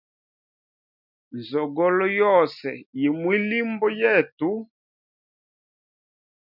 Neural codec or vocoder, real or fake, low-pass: none; real; 5.4 kHz